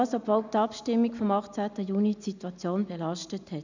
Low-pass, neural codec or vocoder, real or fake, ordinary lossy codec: 7.2 kHz; none; real; none